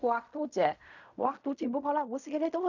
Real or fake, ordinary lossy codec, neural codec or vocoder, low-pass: fake; none; codec, 16 kHz in and 24 kHz out, 0.4 kbps, LongCat-Audio-Codec, fine tuned four codebook decoder; 7.2 kHz